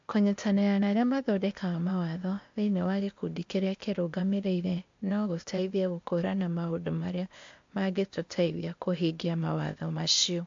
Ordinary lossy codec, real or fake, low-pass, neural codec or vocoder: MP3, 48 kbps; fake; 7.2 kHz; codec, 16 kHz, 0.8 kbps, ZipCodec